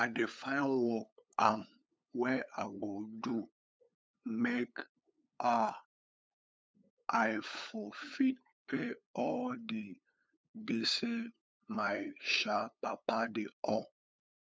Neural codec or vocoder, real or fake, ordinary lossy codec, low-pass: codec, 16 kHz, 8 kbps, FunCodec, trained on LibriTTS, 25 frames a second; fake; none; none